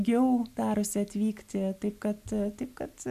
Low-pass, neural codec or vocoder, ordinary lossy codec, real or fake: 14.4 kHz; none; MP3, 96 kbps; real